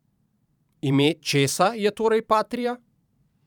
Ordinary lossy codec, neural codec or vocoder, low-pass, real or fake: none; vocoder, 44.1 kHz, 128 mel bands every 512 samples, BigVGAN v2; 19.8 kHz; fake